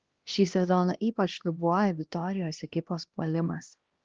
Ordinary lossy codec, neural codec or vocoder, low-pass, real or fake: Opus, 16 kbps; codec, 16 kHz, 1 kbps, X-Codec, HuBERT features, trained on LibriSpeech; 7.2 kHz; fake